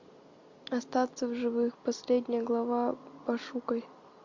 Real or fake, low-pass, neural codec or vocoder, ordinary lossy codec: real; 7.2 kHz; none; MP3, 48 kbps